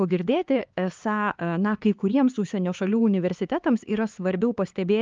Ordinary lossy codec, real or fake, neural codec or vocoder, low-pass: Opus, 32 kbps; fake; codec, 16 kHz, 4 kbps, X-Codec, HuBERT features, trained on LibriSpeech; 7.2 kHz